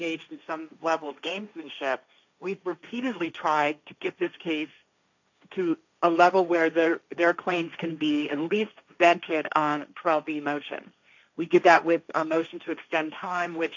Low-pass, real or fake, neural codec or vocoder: 7.2 kHz; fake; codec, 16 kHz, 1.1 kbps, Voila-Tokenizer